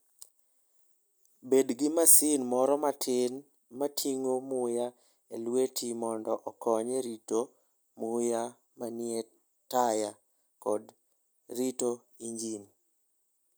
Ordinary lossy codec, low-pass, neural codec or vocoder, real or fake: none; none; none; real